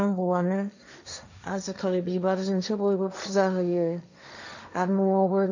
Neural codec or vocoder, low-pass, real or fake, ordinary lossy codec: codec, 16 kHz, 1.1 kbps, Voila-Tokenizer; 7.2 kHz; fake; none